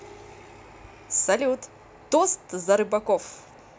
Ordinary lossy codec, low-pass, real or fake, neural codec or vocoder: none; none; real; none